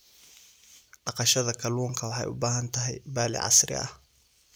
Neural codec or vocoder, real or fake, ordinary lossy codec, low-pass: none; real; none; none